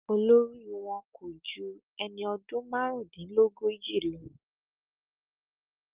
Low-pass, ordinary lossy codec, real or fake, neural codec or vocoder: 3.6 kHz; Opus, 24 kbps; real; none